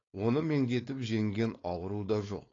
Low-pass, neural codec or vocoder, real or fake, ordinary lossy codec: 7.2 kHz; codec, 16 kHz, 4.8 kbps, FACodec; fake; AAC, 32 kbps